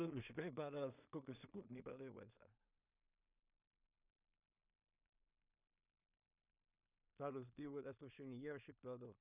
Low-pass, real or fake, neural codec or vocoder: 3.6 kHz; fake; codec, 16 kHz in and 24 kHz out, 0.4 kbps, LongCat-Audio-Codec, two codebook decoder